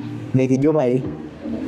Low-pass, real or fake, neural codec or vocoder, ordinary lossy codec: 14.4 kHz; fake; codec, 32 kHz, 1.9 kbps, SNAC; none